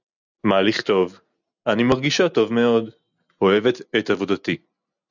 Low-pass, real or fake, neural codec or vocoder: 7.2 kHz; real; none